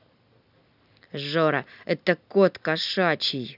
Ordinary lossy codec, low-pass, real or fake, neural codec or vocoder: none; 5.4 kHz; real; none